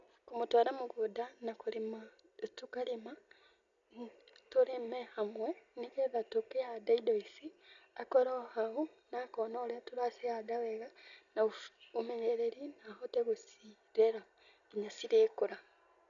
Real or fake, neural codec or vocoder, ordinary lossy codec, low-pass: real; none; none; 7.2 kHz